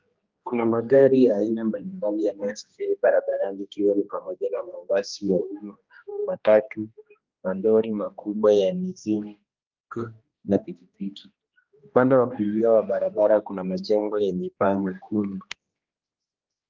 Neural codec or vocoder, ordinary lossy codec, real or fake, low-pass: codec, 16 kHz, 1 kbps, X-Codec, HuBERT features, trained on general audio; Opus, 24 kbps; fake; 7.2 kHz